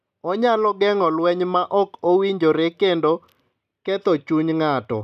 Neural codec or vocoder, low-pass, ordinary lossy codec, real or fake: none; 14.4 kHz; none; real